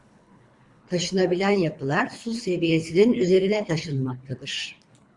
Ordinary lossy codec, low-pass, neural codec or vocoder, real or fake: Opus, 64 kbps; 10.8 kHz; codec, 24 kHz, 3 kbps, HILCodec; fake